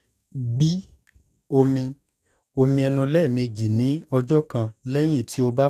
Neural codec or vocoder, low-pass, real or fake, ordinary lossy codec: codec, 44.1 kHz, 2.6 kbps, DAC; 14.4 kHz; fake; none